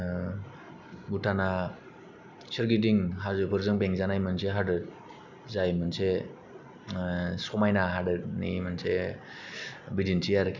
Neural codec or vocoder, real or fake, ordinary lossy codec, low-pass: none; real; none; 7.2 kHz